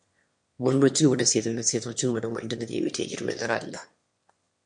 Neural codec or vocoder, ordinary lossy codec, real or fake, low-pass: autoencoder, 22.05 kHz, a latent of 192 numbers a frame, VITS, trained on one speaker; MP3, 48 kbps; fake; 9.9 kHz